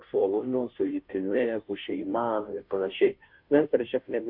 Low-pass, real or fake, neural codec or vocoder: 5.4 kHz; fake; codec, 16 kHz, 0.5 kbps, FunCodec, trained on Chinese and English, 25 frames a second